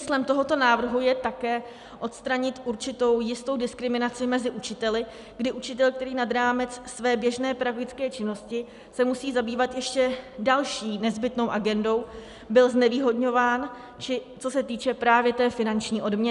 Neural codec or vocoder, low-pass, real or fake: none; 10.8 kHz; real